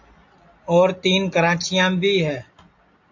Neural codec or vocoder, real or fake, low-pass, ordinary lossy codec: none; real; 7.2 kHz; AAC, 48 kbps